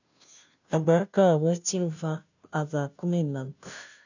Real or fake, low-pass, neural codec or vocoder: fake; 7.2 kHz; codec, 16 kHz, 0.5 kbps, FunCodec, trained on Chinese and English, 25 frames a second